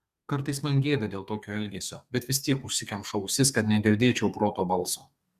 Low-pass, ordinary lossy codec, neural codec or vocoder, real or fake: 14.4 kHz; Opus, 64 kbps; codec, 32 kHz, 1.9 kbps, SNAC; fake